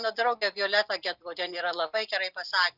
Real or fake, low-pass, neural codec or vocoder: real; 5.4 kHz; none